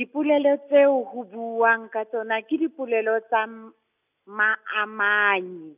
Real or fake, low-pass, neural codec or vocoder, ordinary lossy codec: real; 3.6 kHz; none; none